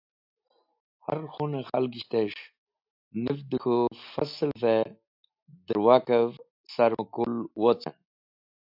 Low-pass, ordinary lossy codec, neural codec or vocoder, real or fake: 5.4 kHz; AAC, 48 kbps; none; real